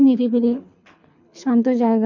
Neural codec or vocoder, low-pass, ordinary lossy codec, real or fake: codec, 24 kHz, 6 kbps, HILCodec; 7.2 kHz; MP3, 64 kbps; fake